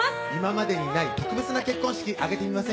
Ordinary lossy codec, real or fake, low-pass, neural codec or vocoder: none; real; none; none